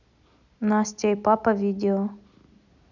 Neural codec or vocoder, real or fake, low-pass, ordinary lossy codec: none; real; 7.2 kHz; none